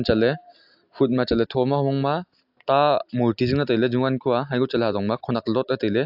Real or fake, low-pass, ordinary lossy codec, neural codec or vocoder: real; 5.4 kHz; AAC, 48 kbps; none